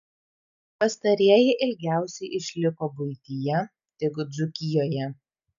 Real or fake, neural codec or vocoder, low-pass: real; none; 7.2 kHz